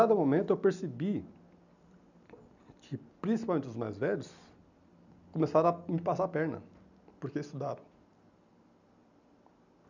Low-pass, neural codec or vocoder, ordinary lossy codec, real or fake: 7.2 kHz; none; none; real